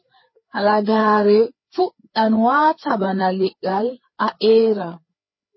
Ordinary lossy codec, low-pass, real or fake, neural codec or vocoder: MP3, 24 kbps; 7.2 kHz; fake; codec, 16 kHz, 4 kbps, FreqCodec, larger model